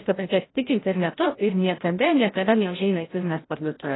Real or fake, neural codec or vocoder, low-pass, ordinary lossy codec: fake; codec, 16 kHz, 0.5 kbps, FreqCodec, larger model; 7.2 kHz; AAC, 16 kbps